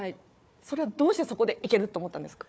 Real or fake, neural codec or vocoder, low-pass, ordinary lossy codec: fake; codec, 16 kHz, 4 kbps, FunCodec, trained on Chinese and English, 50 frames a second; none; none